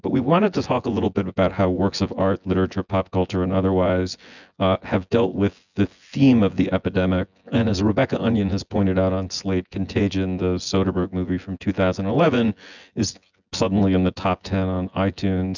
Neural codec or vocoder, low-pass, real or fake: vocoder, 24 kHz, 100 mel bands, Vocos; 7.2 kHz; fake